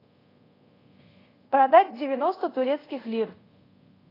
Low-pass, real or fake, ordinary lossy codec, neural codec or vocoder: 5.4 kHz; fake; AAC, 24 kbps; codec, 24 kHz, 0.5 kbps, DualCodec